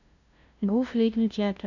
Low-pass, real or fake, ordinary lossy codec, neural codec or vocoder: 7.2 kHz; fake; none; codec, 16 kHz, 0.5 kbps, FunCodec, trained on LibriTTS, 25 frames a second